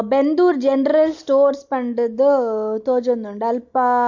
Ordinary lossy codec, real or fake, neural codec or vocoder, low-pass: MP3, 64 kbps; real; none; 7.2 kHz